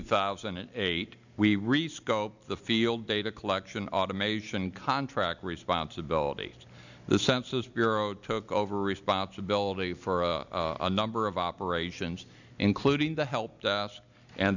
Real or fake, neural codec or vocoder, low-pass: real; none; 7.2 kHz